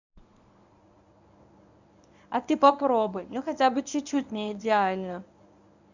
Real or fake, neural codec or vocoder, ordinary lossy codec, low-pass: fake; codec, 24 kHz, 0.9 kbps, WavTokenizer, medium speech release version 1; none; 7.2 kHz